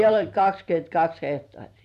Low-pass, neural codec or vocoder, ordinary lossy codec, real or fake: 14.4 kHz; vocoder, 44.1 kHz, 128 mel bands every 256 samples, BigVGAN v2; none; fake